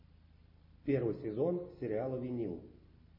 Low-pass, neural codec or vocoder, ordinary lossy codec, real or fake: 5.4 kHz; none; MP3, 24 kbps; real